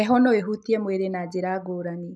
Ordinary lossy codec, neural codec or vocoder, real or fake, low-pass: none; none; real; none